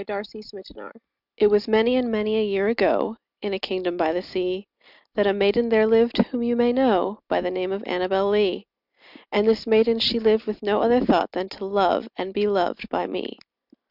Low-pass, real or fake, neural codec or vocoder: 5.4 kHz; real; none